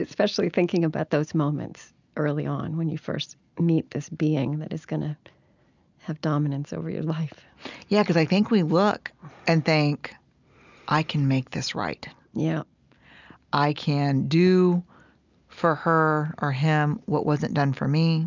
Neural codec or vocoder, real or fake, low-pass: none; real; 7.2 kHz